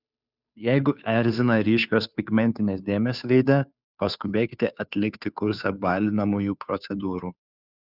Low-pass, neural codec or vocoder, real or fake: 5.4 kHz; codec, 16 kHz, 2 kbps, FunCodec, trained on Chinese and English, 25 frames a second; fake